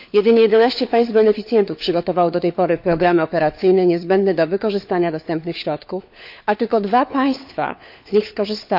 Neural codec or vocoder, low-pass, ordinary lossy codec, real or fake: codec, 16 kHz, 4 kbps, FunCodec, trained on LibriTTS, 50 frames a second; 5.4 kHz; none; fake